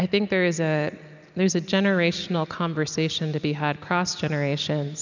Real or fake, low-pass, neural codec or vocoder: real; 7.2 kHz; none